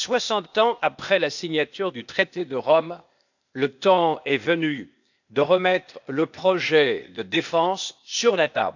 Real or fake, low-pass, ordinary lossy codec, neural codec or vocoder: fake; 7.2 kHz; none; codec, 16 kHz, 0.8 kbps, ZipCodec